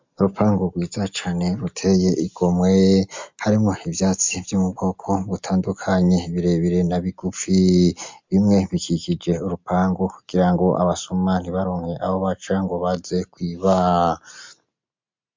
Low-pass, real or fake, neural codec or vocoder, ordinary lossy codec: 7.2 kHz; real; none; MP3, 64 kbps